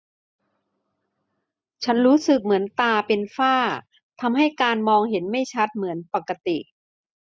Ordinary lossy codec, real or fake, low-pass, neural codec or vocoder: none; real; none; none